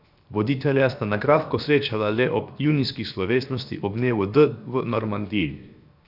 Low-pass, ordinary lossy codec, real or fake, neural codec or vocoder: 5.4 kHz; none; fake; codec, 16 kHz, 0.7 kbps, FocalCodec